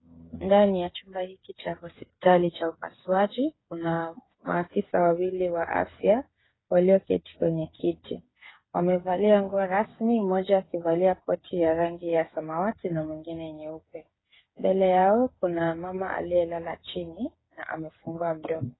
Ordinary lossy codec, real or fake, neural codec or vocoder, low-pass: AAC, 16 kbps; fake; codec, 16 kHz, 8 kbps, FreqCodec, smaller model; 7.2 kHz